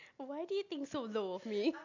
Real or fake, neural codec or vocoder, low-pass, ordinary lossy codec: real; none; 7.2 kHz; none